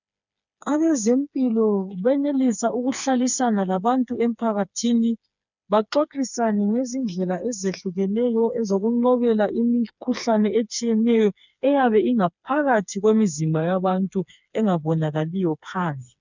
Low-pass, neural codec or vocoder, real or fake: 7.2 kHz; codec, 16 kHz, 4 kbps, FreqCodec, smaller model; fake